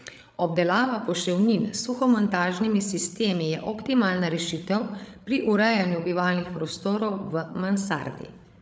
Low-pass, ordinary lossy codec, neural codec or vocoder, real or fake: none; none; codec, 16 kHz, 8 kbps, FreqCodec, larger model; fake